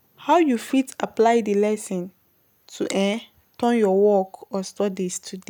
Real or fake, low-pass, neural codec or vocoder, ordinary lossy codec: real; none; none; none